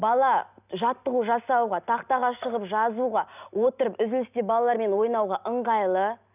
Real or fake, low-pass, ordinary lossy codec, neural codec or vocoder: real; 3.6 kHz; none; none